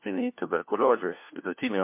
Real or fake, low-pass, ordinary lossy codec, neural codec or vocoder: fake; 3.6 kHz; MP3, 32 kbps; codec, 16 kHz, 1 kbps, FunCodec, trained on LibriTTS, 50 frames a second